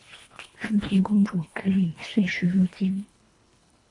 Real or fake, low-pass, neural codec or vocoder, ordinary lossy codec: fake; 10.8 kHz; codec, 24 kHz, 1.5 kbps, HILCodec; AAC, 48 kbps